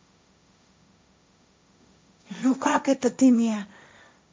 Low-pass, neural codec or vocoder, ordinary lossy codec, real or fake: none; codec, 16 kHz, 1.1 kbps, Voila-Tokenizer; none; fake